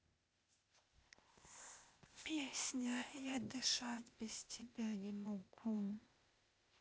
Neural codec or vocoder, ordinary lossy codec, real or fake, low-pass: codec, 16 kHz, 0.8 kbps, ZipCodec; none; fake; none